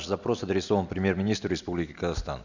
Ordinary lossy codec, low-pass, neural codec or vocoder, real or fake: none; 7.2 kHz; none; real